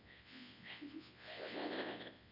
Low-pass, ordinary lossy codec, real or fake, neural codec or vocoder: 5.4 kHz; none; fake; codec, 24 kHz, 0.9 kbps, WavTokenizer, large speech release